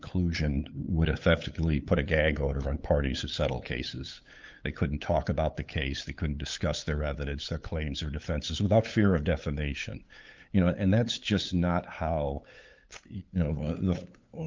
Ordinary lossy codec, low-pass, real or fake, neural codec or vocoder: Opus, 24 kbps; 7.2 kHz; fake; codec, 16 kHz, 8 kbps, FunCodec, trained on LibriTTS, 25 frames a second